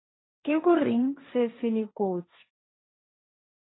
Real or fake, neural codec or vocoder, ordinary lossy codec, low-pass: fake; codec, 16 kHz, 1.1 kbps, Voila-Tokenizer; AAC, 16 kbps; 7.2 kHz